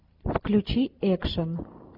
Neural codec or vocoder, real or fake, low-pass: none; real; 5.4 kHz